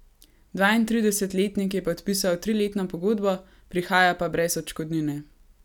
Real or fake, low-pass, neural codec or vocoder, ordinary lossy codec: real; 19.8 kHz; none; none